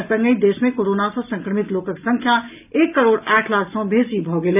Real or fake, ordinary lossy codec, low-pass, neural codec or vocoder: real; none; 3.6 kHz; none